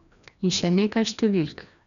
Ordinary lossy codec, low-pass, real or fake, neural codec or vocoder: none; 7.2 kHz; fake; codec, 16 kHz, 1 kbps, FreqCodec, larger model